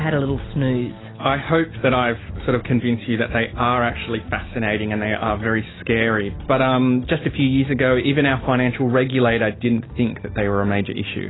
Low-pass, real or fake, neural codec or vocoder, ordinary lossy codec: 7.2 kHz; real; none; AAC, 16 kbps